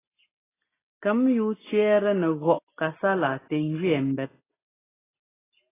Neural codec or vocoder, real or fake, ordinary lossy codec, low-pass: none; real; AAC, 16 kbps; 3.6 kHz